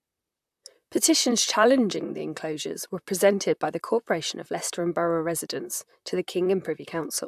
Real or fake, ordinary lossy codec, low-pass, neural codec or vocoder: fake; AAC, 96 kbps; 14.4 kHz; vocoder, 44.1 kHz, 128 mel bands, Pupu-Vocoder